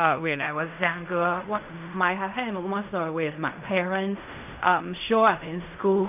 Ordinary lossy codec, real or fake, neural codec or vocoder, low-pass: none; fake; codec, 16 kHz in and 24 kHz out, 0.4 kbps, LongCat-Audio-Codec, fine tuned four codebook decoder; 3.6 kHz